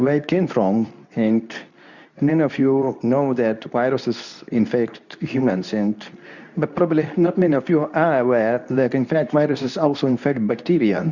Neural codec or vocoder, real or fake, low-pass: codec, 24 kHz, 0.9 kbps, WavTokenizer, medium speech release version 2; fake; 7.2 kHz